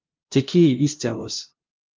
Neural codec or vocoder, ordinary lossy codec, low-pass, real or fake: codec, 16 kHz, 0.5 kbps, FunCodec, trained on LibriTTS, 25 frames a second; Opus, 24 kbps; 7.2 kHz; fake